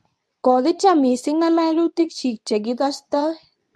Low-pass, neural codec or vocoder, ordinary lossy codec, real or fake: none; codec, 24 kHz, 0.9 kbps, WavTokenizer, medium speech release version 2; none; fake